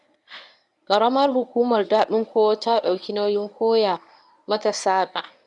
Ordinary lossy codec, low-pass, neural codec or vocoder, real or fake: none; 10.8 kHz; codec, 24 kHz, 0.9 kbps, WavTokenizer, medium speech release version 1; fake